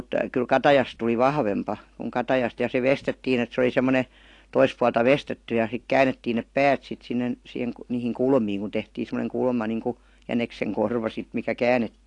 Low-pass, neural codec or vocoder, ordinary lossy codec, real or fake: 10.8 kHz; none; AAC, 48 kbps; real